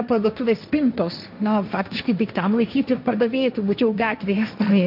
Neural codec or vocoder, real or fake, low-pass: codec, 16 kHz, 1.1 kbps, Voila-Tokenizer; fake; 5.4 kHz